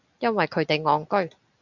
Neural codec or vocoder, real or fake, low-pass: none; real; 7.2 kHz